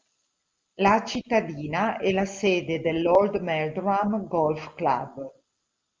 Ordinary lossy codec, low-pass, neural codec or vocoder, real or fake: Opus, 24 kbps; 7.2 kHz; none; real